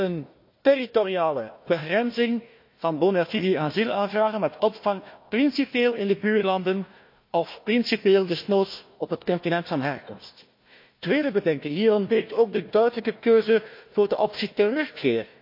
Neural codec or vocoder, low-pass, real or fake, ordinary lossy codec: codec, 16 kHz, 1 kbps, FunCodec, trained on Chinese and English, 50 frames a second; 5.4 kHz; fake; MP3, 32 kbps